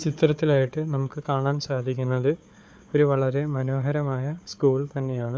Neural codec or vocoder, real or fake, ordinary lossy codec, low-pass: codec, 16 kHz, 4 kbps, FunCodec, trained on Chinese and English, 50 frames a second; fake; none; none